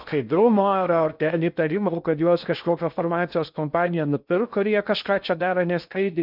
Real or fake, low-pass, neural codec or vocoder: fake; 5.4 kHz; codec, 16 kHz in and 24 kHz out, 0.6 kbps, FocalCodec, streaming, 2048 codes